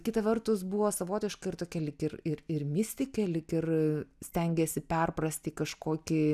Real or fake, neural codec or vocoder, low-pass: real; none; 14.4 kHz